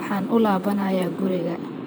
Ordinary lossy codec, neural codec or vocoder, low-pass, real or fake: none; vocoder, 44.1 kHz, 128 mel bands, Pupu-Vocoder; none; fake